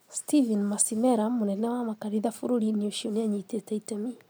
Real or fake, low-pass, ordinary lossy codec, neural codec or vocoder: real; none; none; none